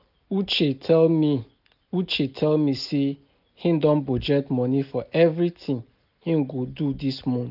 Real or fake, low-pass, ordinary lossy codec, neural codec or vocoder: real; 5.4 kHz; none; none